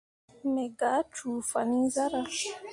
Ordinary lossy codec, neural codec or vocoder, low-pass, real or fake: AAC, 64 kbps; none; 10.8 kHz; real